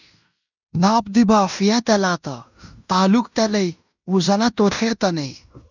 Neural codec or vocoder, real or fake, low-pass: codec, 16 kHz in and 24 kHz out, 0.9 kbps, LongCat-Audio-Codec, fine tuned four codebook decoder; fake; 7.2 kHz